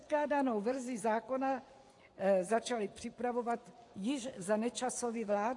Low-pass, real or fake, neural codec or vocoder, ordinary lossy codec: 10.8 kHz; fake; vocoder, 24 kHz, 100 mel bands, Vocos; AAC, 48 kbps